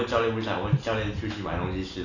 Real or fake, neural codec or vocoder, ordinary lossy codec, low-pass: real; none; none; 7.2 kHz